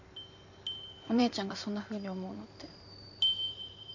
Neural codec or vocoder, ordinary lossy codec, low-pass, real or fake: vocoder, 44.1 kHz, 128 mel bands every 512 samples, BigVGAN v2; MP3, 48 kbps; 7.2 kHz; fake